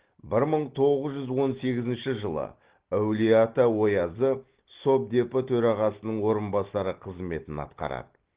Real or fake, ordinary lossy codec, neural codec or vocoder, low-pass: real; Opus, 16 kbps; none; 3.6 kHz